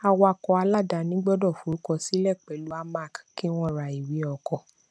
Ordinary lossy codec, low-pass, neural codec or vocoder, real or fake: none; none; none; real